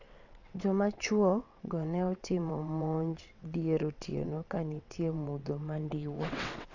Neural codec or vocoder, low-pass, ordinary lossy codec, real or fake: vocoder, 22.05 kHz, 80 mel bands, WaveNeXt; 7.2 kHz; none; fake